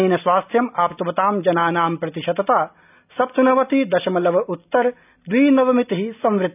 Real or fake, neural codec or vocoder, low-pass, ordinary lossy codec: real; none; 3.6 kHz; none